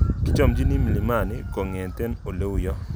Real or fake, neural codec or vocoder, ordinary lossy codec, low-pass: real; none; none; none